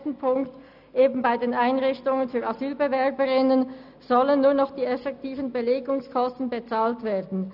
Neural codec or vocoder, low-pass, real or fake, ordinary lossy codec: none; 5.4 kHz; real; none